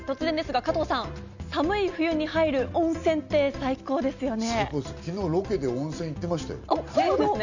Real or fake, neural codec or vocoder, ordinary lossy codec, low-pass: real; none; none; 7.2 kHz